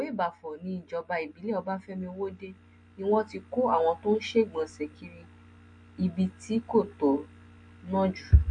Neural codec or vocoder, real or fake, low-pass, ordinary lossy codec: none; real; 9.9 kHz; MP3, 48 kbps